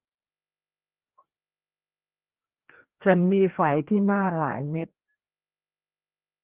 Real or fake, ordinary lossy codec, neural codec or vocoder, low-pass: fake; Opus, 16 kbps; codec, 16 kHz, 1 kbps, FreqCodec, larger model; 3.6 kHz